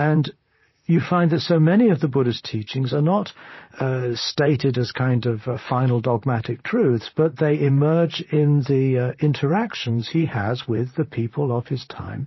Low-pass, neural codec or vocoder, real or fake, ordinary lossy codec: 7.2 kHz; vocoder, 44.1 kHz, 128 mel bands, Pupu-Vocoder; fake; MP3, 24 kbps